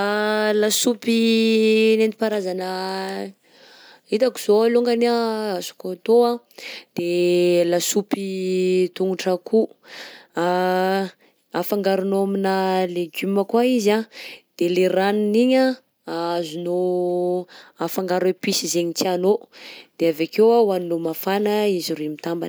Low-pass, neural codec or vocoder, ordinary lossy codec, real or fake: none; none; none; real